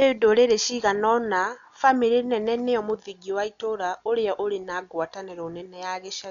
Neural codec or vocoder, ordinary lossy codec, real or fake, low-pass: none; none; real; 7.2 kHz